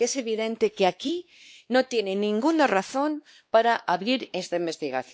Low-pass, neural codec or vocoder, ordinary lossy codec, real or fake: none; codec, 16 kHz, 2 kbps, X-Codec, WavLM features, trained on Multilingual LibriSpeech; none; fake